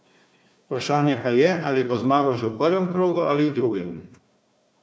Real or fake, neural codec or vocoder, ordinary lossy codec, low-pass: fake; codec, 16 kHz, 1 kbps, FunCodec, trained on Chinese and English, 50 frames a second; none; none